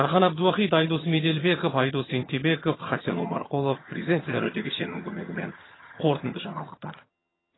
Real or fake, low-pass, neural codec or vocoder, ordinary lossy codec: fake; 7.2 kHz; vocoder, 22.05 kHz, 80 mel bands, HiFi-GAN; AAC, 16 kbps